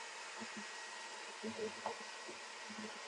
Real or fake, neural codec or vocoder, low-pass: real; none; 10.8 kHz